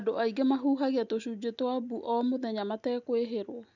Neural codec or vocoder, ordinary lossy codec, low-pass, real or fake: none; none; 7.2 kHz; real